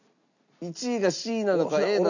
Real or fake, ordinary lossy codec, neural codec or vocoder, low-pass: real; none; none; 7.2 kHz